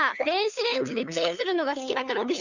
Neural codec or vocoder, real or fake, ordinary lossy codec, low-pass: codec, 16 kHz, 2 kbps, FunCodec, trained on LibriTTS, 25 frames a second; fake; none; 7.2 kHz